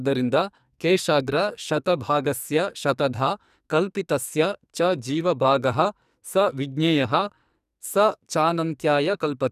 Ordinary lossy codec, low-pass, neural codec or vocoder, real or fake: none; 14.4 kHz; codec, 44.1 kHz, 2.6 kbps, SNAC; fake